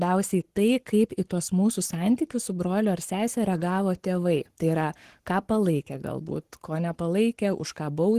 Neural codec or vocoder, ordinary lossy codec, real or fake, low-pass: codec, 44.1 kHz, 7.8 kbps, Pupu-Codec; Opus, 16 kbps; fake; 14.4 kHz